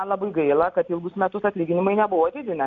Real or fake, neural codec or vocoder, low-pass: real; none; 7.2 kHz